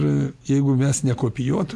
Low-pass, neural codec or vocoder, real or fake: 14.4 kHz; none; real